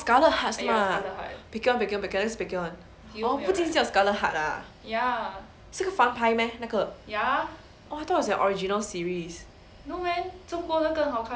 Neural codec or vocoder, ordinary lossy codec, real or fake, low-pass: none; none; real; none